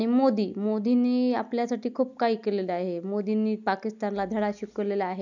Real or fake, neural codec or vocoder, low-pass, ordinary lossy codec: real; none; 7.2 kHz; none